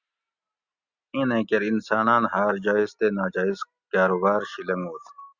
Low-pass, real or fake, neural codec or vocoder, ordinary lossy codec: 7.2 kHz; real; none; Opus, 64 kbps